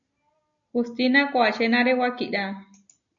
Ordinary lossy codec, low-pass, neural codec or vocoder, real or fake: MP3, 96 kbps; 7.2 kHz; none; real